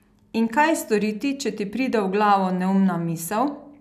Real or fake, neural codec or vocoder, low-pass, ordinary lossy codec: real; none; 14.4 kHz; none